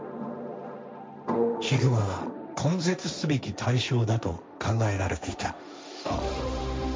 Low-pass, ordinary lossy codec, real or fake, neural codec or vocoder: none; none; fake; codec, 16 kHz, 1.1 kbps, Voila-Tokenizer